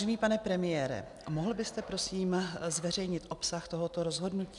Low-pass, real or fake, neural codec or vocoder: 10.8 kHz; real; none